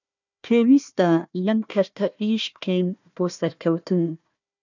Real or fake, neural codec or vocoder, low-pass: fake; codec, 16 kHz, 1 kbps, FunCodec, trained on Chinese and English, 50 frames a second; 7.2 kHz